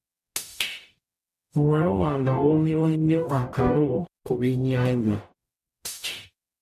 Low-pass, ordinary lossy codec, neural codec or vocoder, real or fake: 14.4 kHz; none; codec, 44.1 kHz, 0.9 kbps, DAC; fake